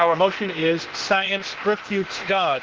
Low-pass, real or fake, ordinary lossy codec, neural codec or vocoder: 7.2 kHz; fake; Opus, 16 kbps; codec, 16 kHz, 0.8 kbps, ZipCodec